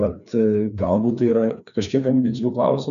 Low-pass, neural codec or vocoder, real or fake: 7.2 kHz; codec, 16 kHz, 2 kbps, FreqCodec, larger model; fake